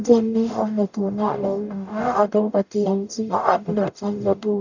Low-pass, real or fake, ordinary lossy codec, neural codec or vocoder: 7.2 kHz; fake; none; codec, 44.1 kHz, 0.9 kbps, DAC